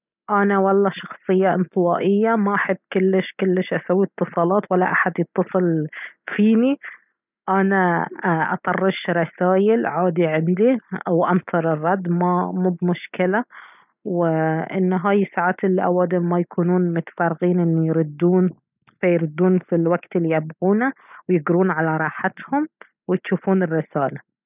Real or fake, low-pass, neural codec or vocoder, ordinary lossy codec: real; 3.6 kHz; none; none